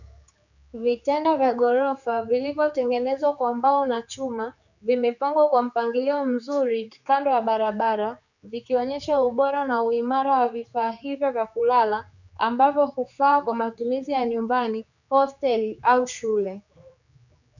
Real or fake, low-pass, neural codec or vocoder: fake; 7.2 kHz; codec, 16 kHz, 4 kbps, X-Codec, HuBERT features, trained on general audio